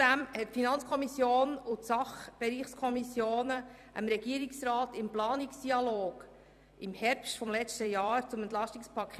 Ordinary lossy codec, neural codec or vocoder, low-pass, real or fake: none; vocoder, 44.1 kHz, 128 mel bands every 256 samples, BigVGAN v2; 14.4 kHz; fake